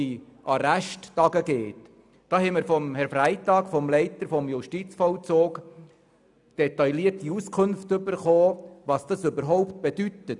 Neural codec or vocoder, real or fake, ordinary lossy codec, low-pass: none; real; none; 10.8 kHz